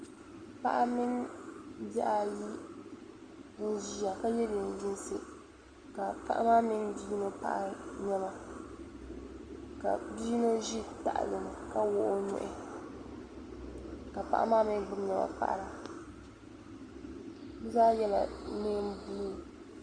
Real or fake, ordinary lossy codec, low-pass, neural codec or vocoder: real; Opus, 32 kbps; 9.9 kHz; none